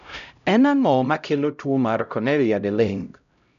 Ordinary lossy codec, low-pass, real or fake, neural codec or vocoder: none; 7.2 kHz; fake; codec, 16 kHz, 0.5 kbps, X-Codec, HuBERT features, trained on LibriSpeech